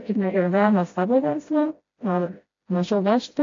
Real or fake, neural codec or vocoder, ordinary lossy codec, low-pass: fake; codec, 16 kHz, 0.5 kbps, FreqCodec, smaller model; AAC, 48 kbps; 7.2 kHz